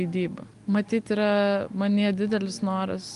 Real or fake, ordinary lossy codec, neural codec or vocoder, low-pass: real; Opus, 32 kbps; none; 10.8 kHz